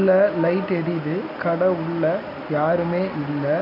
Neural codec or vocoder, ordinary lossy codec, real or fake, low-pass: none; none; real; 5.4 kHz